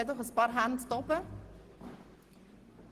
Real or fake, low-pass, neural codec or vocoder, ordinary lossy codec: real; 14.4 kHz; none; Opus, 16 kbps